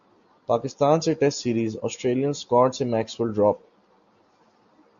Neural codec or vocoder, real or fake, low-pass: none; real; 7.2 kHz